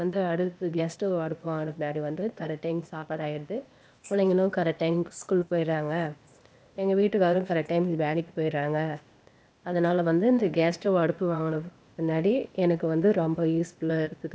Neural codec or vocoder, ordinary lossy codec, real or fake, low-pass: codec, 16 kHz, 0.8 kbps, ZipCodec; none; fake; none